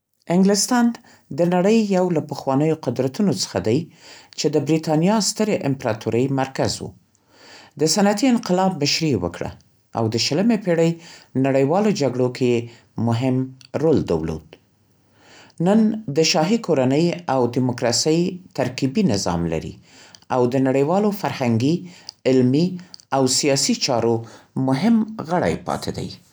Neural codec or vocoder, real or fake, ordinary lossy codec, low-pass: none; real; none; none